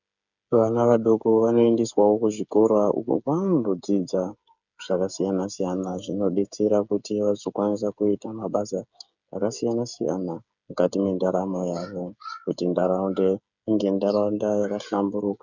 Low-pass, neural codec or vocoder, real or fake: 7.2 kHz; codec, 16 kHz, 8 kbps, FreqCodec, smaller model; fake